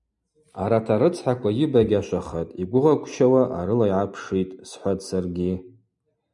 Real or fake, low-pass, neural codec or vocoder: real; 10.8 kHz; none